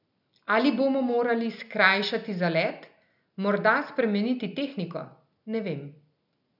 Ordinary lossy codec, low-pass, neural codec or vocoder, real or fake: none; 5.4 kHz; none; real